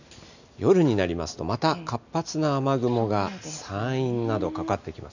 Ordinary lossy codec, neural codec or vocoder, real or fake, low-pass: none; none; real; 7.2 kHz